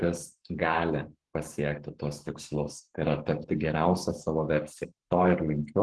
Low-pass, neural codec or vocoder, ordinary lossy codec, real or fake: 10.8 kHz; codec, 44.1 kHz, 7.8 kbps, Pupu-Codec; Opus, 16 kbps; fake